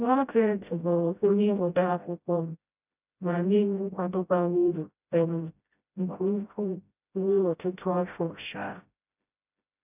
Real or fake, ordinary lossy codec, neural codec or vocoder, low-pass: fake; none; codec, 16 kHz, 0.5 kbps, FreqCodec, smaller model; 3.6 kHz